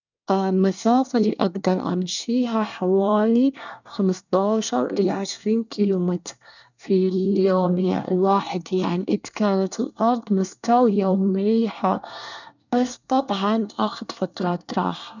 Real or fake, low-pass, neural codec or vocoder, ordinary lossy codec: fake; 7.2 kHz; codec, 24 kHz, 1 kbps, SNAC; none